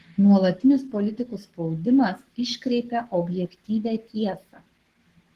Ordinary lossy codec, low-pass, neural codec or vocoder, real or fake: Opus, 16 kbps; 14.4 kHz; codec, 44.1 kHz, 7.8 kbps, Pupu-Codec; fake